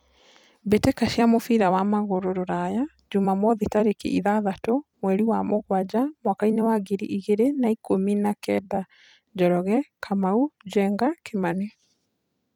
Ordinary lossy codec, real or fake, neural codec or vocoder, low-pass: none; fake; vocoder, 44.1 kHz, 128 mel bands every 256 samples, BigVGAN v2; 19.8 kHz